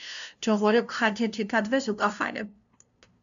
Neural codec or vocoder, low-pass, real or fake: codec, 16 kHz, 0.5 kbps, FunCodec, trained on LibriTTS, 25 frames a second; 7.2 kHz; fake